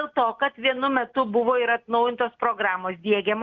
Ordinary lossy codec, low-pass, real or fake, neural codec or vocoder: Opus, 32 kbps; 7.2 kHz; real; none